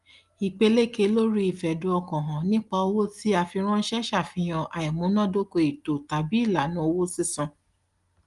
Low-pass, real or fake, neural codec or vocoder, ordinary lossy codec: 10.8 kHz; real; none; Opus, 32 kbps